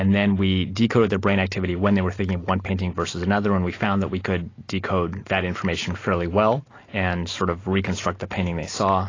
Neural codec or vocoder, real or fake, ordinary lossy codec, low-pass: none; real; AAC, 32 kbps; 7.2 kHz